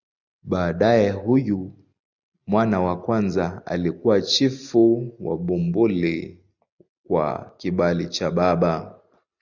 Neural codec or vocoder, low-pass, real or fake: none; 7.2 kHz; real